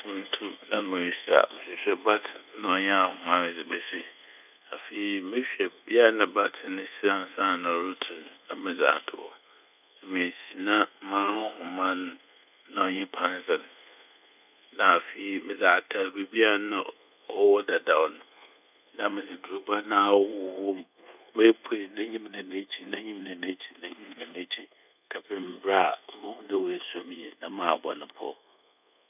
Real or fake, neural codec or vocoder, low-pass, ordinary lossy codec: fake; codec, 24 kHz, 1.2 kbps, DualCodec; 3.6 kHz; none